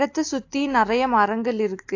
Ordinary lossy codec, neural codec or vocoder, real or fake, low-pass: AAC, 48 kbps; none; real; 7.2 kHz